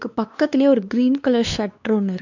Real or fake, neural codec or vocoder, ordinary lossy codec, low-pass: fake; codec, 16 kHz, 2 kbps, X-Codec, WavLM features, trained on Multilingual LibriSpeech; none; 7.2 kHz